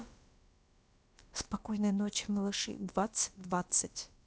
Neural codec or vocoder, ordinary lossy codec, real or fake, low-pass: codec, 16 kHz, about 1 kbps, DyCAST, with the encoder's durations; none; fake; none